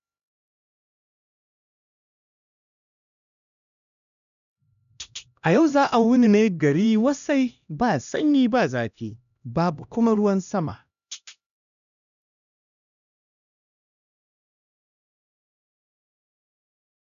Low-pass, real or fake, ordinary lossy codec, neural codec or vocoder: 7.2 kHz; fake; none; codec, 16 kHz, 1 kbps, X-Codec, HuBERT features, trained on LibriSpeech